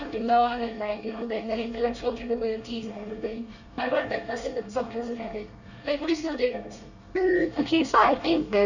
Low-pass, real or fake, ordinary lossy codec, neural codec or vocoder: 7.2 kHz; fake; none; codec, 24 kHz, 1 kbps, SNAC